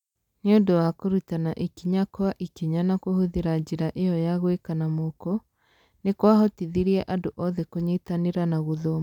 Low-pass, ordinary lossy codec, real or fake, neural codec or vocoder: 19.8 kHz; none; real; none